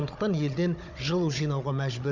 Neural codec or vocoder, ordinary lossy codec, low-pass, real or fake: codec, 16 kHz, 16 kbps, FunCodec, trained on Chinese and English, 50 frames a second; none; 7.2 kHz; fake